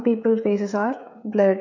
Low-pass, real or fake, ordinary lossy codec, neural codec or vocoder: 7.2 kHz; fake; none; codec, 16 kHz, 16 kbps, FreqCodec, smaller model